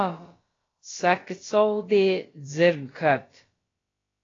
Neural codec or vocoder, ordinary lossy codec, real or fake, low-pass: codec, 16 kHz, about 1 kbps, DyCAST, with the encoder's durations; AAC, 32 kbps; fake; 7.2 kHz